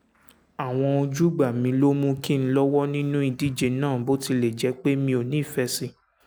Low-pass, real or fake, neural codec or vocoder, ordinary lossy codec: none; real; none; none